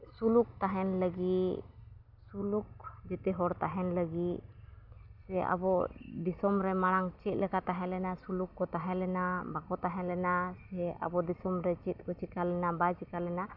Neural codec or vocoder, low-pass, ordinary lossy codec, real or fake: none; 5.4 kHz; none; real